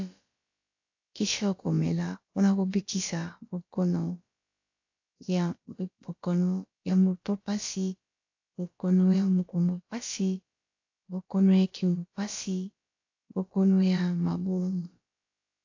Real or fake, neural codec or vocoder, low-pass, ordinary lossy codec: fake; codec, 16 kHz, about 1 kbps, DyCAST, with the encoder's durations; 7.2 kHz; AAC, 48 kbps